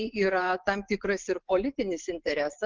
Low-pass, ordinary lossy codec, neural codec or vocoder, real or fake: 7.2 kHz; Opus, 16 kbps; vocoder, 24 kHz, 100 mel bands, Vocos; fake